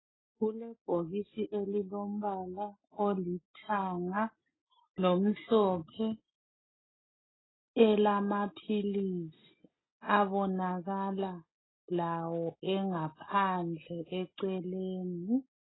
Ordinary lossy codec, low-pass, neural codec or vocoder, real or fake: AAC, 16 kbps; 7.2 kHz; none; real